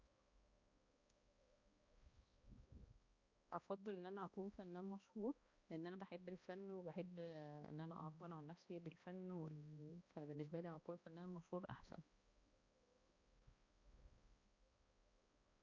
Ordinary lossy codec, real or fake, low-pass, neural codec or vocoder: Opus, 64 kbps; fake; 7.2 kHz; codec, 16 kHz, 1 kbps, X-Codec, HuBERT features, trained on balanced general audio